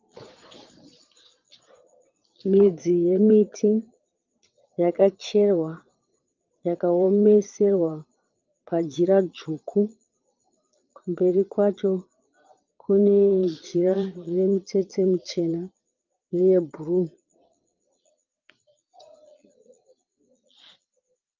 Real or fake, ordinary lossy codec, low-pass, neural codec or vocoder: fake; Opus, 32 kbps; 7.2 kHz; vocoder, 22.05 kHz, 80 mel bands, Vocos